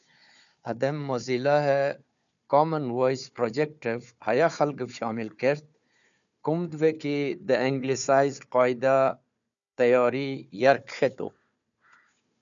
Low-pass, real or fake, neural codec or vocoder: 7.2 kHz; fake; codec, 16 kHz, 4 kbps, FunCodec, trained on Chinese and English, 50 frames a second